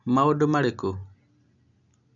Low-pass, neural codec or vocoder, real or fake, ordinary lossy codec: 7.2 kHz; none; real; none